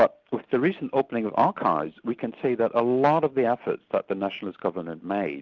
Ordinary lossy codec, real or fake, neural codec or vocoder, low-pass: Opus, 32 kbps; real; none; 7.2 kHz